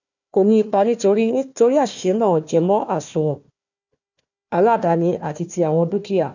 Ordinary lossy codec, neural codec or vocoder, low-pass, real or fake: none; codec, 16 kHz, 1 kbps, FunCodec, trained on Chinese and English, 50 frames a second; 7.2 kHz; fake